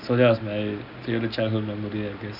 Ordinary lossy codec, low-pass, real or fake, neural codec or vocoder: none; 5.4 kHz; real; none